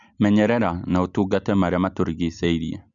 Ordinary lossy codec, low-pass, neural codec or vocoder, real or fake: none; 7.2 kHz; none; real